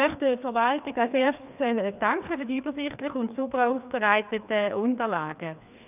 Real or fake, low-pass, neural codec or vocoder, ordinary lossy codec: fake; 3.6 kHz; codec, 16 kHz, 2 kbps, FreqCodec, larger model; none